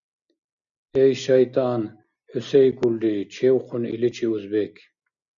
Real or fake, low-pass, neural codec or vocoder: real; 7.2 kHz; none